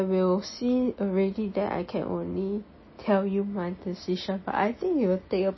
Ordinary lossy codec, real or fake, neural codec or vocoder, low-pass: MP3, 24 kbps; real; none; 7.2 kHz